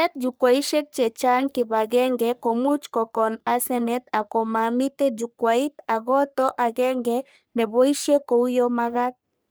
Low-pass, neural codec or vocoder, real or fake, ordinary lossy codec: none; codec, 44.1 kHz, 3.4 kbps, Pupu-Codec; fake; none